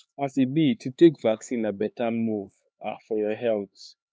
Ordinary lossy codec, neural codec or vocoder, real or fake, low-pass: none; codec, 16 kHz, 2 kbps, X-Codec, HuBERT features, trained on LibriSpeech; fake; none